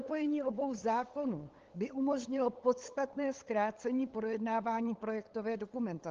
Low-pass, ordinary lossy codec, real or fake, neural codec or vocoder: 7.2 kHz; Opus, 16 kbps; fake; codec, 16 kHz, 8 kbps, FunCodec, trained on LibriTTS, 25 frames a second